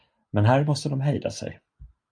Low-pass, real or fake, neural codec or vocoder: 9.9 kHz; real; none